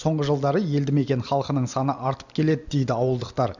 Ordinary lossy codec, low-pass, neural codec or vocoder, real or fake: none; 7.2 kHz; none; real